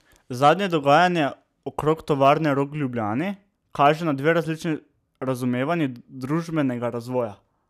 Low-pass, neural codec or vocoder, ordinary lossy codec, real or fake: 14.4 kHz; none; none; real